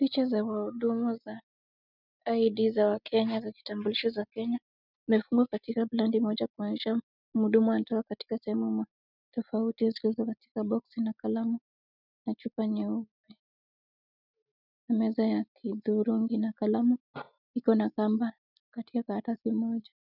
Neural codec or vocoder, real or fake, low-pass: none; real; 5.4 kHz